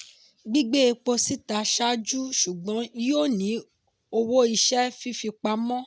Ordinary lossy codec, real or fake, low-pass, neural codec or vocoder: none; real; none; none